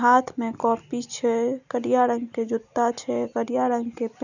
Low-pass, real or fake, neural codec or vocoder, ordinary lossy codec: 7.2 kHz; real; none; none